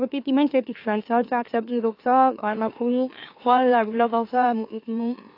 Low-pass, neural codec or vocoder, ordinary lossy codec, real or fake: 5.4 kHz; autoencoder, 44.1 kHz, a latent of 192 numbers a frame, MeloTTS; AAC, 32 kbps; fake